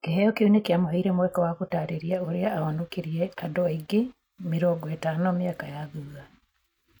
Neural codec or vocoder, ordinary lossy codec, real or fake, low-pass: none; none; real; 19.8 kHz